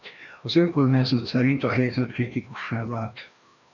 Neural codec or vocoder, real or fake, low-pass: codec, 16 kHz, 1 kbps, FreqCodec, larger model; fake; 7.2 kHz